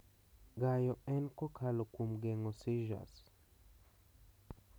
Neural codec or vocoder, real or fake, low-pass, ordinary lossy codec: none; real; none; none